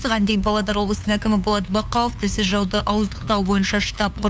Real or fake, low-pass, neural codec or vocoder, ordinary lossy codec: fake; none; codec, 16 kHz, 2 kbps, FunCodec, trained on LibriTTS, 25 frames a second; none